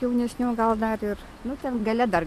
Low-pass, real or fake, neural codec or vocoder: 14.4 kHz; real; none